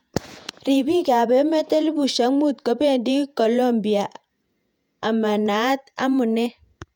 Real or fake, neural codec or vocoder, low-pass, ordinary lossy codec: fake; vocoder, 48 kHz, 128 mel bands, Vocos; 19.8 kHz; none